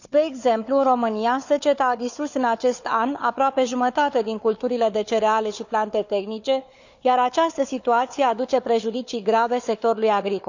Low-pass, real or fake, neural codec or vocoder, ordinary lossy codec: 7.2 kHz; fake; codec, 16 kHz, 4 kbps, FunCodec, trained on Chinese and English, 50 frames a second; none